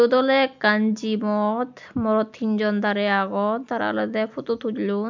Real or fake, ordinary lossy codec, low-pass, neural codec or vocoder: real; MP3, 64 kbps; 7.2 kHz; none